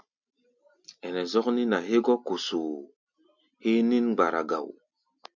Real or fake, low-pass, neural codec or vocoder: real; 7.2 kHz; none